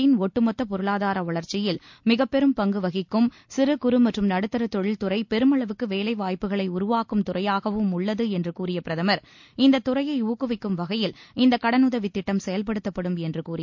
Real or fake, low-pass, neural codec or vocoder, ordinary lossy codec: real; 7.2 kHz; none; MP3, 48 kbps